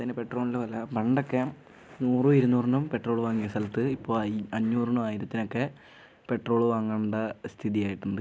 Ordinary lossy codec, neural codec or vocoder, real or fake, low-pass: none; none; real; none